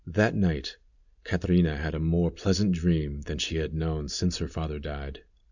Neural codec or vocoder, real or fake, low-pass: none; real; 7.2 kHz